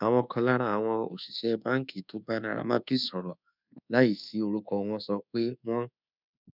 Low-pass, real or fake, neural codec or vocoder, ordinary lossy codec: 5.4 kHz; fake; codec, 24 kHz, 1.2 kbps, DualCodec; none